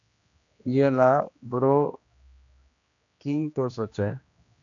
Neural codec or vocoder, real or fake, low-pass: codec, 16 kHz, 1 kbps, X-Codec, HuBERT features, trained on general audio; fake; 7.2 kHz